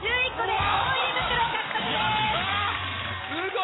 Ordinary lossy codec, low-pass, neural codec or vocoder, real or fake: AAC, 16 kbps; 7.2 kHz; vocoder, 44.1 kHz, 128 mel bands every 256 samples, BigVGAN v2; fake